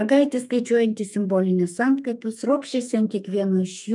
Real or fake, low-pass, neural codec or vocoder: fake; 10.8 kHz; codec, 32 kHz, 1.9 kbps, SNAC